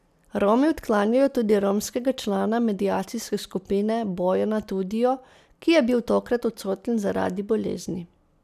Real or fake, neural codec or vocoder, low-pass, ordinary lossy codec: real; none; 14.4 kHz; none